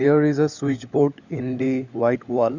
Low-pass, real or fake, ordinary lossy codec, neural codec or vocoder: 7.2 kHz; fake; Opus, 64 kbps; codec, 16 kHz, 16 kbps, FunCodec, trained on LibriTTS, 50 frames a second